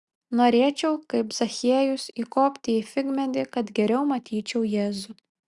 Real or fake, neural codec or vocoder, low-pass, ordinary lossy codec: real; none; 10.8 kHz; Opus, 64 kbps